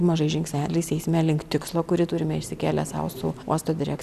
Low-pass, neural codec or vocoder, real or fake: 14.4 kHz; none; real